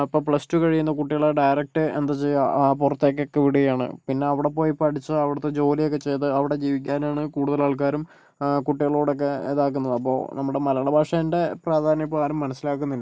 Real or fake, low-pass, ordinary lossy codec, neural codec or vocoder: real; none; none; none